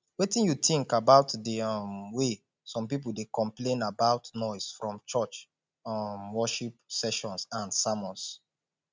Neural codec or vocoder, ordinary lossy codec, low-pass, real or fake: none; none; none; real